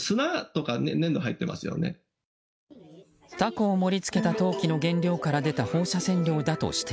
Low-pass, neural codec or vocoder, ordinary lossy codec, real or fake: none; none; none; real